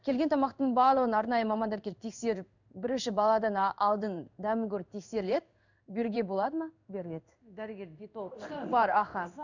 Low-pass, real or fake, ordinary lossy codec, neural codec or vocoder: 7.2 kHz; fake; none; codec, 16 kHz in and 24 kHz out, 1 kbps, XY-Tokenizer